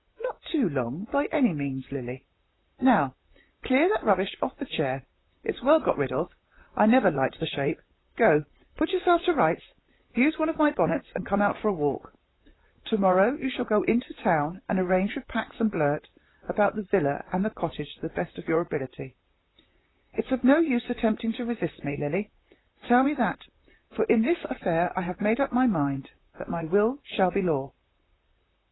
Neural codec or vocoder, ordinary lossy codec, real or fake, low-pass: vocoder, 44.1 kHz, 128 mel bands, Pupu-Vocoder; AAC, 16 kbps; fake; 7.2 kHz